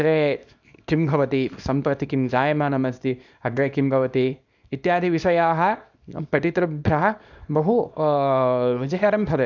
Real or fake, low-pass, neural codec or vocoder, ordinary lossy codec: fake; 7.2 kHz; codec, 24 kHz, 0.9 kbps, WavTokenizer, small release; none